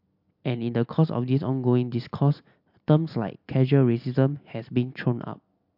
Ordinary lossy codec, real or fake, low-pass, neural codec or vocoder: MP3, 48 kbps; real; 5.4 kHz; none